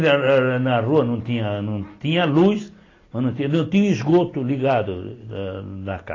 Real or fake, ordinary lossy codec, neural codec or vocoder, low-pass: real; AAC, 32 kbps; none; 7.2 kHz